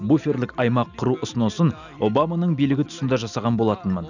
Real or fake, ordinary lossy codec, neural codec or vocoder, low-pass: real; none; none; 7.2 kHz